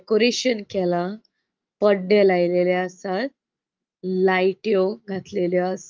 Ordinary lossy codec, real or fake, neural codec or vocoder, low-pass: Opus, 24 kbps; fake; autoencoder, 48 kHz, 128 numbers a frame, DAC-VAE, trained on Japanese speech; 7.2 kHz